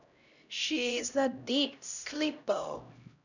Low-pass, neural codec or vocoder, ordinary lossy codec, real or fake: 7.2 kHz; codec, 16 kHz, 0.5 kbps, X-Codec, HuBERT features, trained on LibriSpeech; none; fake